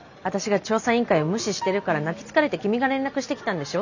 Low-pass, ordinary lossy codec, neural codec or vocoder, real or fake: 7.2 kHz; none; none; real